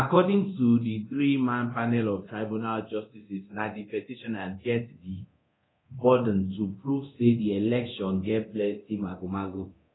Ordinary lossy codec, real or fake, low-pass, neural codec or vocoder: AAC, 16 kbps; fake; 7.2 kHz; codec, 24 kHz, 0.9 kbps, DualCodec